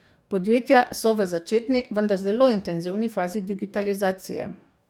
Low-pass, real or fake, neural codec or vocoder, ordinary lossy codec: 19.8 kHz; fake; codec, 44.1 kHz, 2.6 kbps, DAC; none